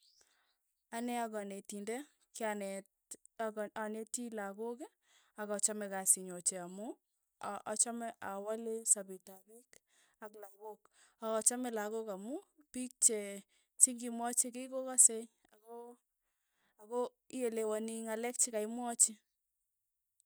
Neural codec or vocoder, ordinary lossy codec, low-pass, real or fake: none; none; none; real